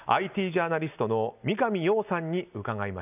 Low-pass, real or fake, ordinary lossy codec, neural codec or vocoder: 3.6 kHz; real; none; none